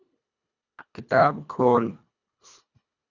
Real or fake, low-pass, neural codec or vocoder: fake; 7.2 kHz; codec, 24 kHz, 1.5 kbps, HILCodec